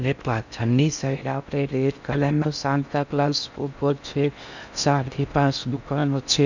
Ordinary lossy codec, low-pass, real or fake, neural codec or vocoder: none; 7.2 kHz; fake; codec, 16 kHz in and 24 kHz out, 0.6 kbps, FocalCodec, streaming, 4096 codes